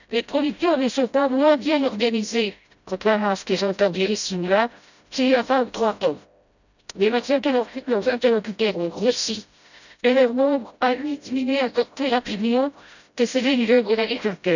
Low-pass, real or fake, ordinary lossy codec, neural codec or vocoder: 7.2 kHz; fake; none; codec, 16 kHz, 0.5 kbps, FreqCodec, smaller model